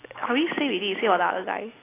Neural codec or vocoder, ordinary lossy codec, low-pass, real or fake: none; AAC, 16 kbps; 3.6 kHz; real